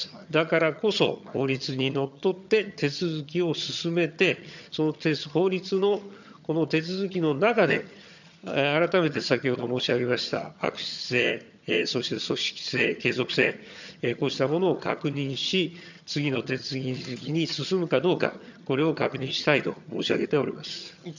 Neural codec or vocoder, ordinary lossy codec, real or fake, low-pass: vocoder, 22.05 kHz, 80 mel bands, HiFi-GAN; none; fake; 7.2 kHz